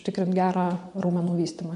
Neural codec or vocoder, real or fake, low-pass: vocoder, 24 kHz, 100 mel bands, Vocos; fake; 10.8 kHz